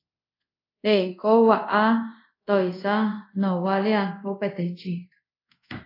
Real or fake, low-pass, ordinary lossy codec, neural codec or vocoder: fake; 5.4 kHz; AAC, 32 kbps; codec, 24 kHz, 0.5 kbps, DualCodec